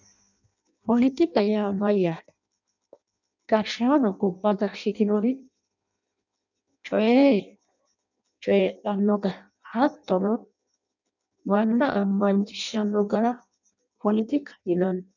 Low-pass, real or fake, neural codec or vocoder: 7.2 kHz; fake; codec, 16 kHz in and 24 kHz out, 0.6 kbps, FireRedTTS-2 codec